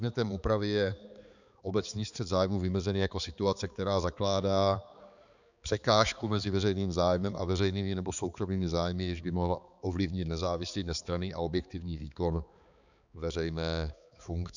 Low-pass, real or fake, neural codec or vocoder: 7.2 kHz; fake; codec, 16 kHz, 4 kbps, X-Codec, HuBERT features, trained on balanced general audio